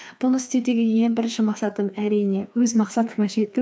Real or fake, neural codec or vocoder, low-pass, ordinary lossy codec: fake; codec, 16 kHz, 2 kbps, FreqCodec, larger model; none; none